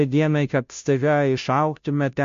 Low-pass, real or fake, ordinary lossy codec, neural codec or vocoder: 7.2 kHz; fake; MP3, 48 kbps; codec, 16 kHz, 0.5 kbps, FunCodec, trained on Chinese and English, 25 frames a second